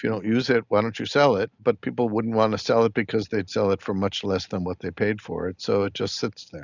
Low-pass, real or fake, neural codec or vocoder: 7.2 kHz; real; none